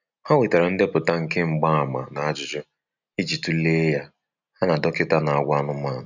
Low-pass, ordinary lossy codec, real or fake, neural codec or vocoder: 7.2 kHz; none; real; none